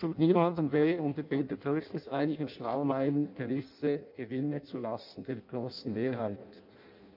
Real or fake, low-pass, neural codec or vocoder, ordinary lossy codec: fake; 5.4 kHz; codec, 16 kHz in and 24 kHz out, 0.6 kbps, FireRedTTS-2 codec; MP3, 48 kbps